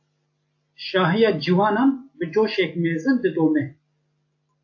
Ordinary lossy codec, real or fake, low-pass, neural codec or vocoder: AAC, 48 kbps; real; 7.2 kHz; none